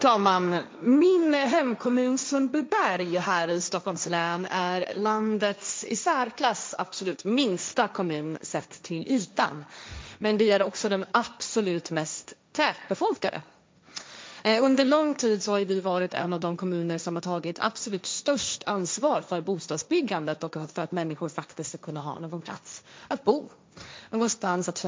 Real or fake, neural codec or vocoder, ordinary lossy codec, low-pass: fake; codec, 16 kHz, 1.1 kbps, Voila-Tokenizer; none; none